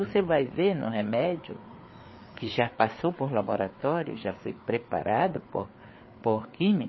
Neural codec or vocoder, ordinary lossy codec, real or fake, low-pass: codec, 16 kHz, 4 kbps, FreqCodec, larger model; MP3, 24 kbps; fake; 7.2 kHz